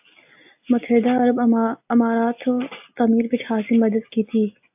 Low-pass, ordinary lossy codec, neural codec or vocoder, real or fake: 3.6 kHz; AAC, 32 kbps; none; real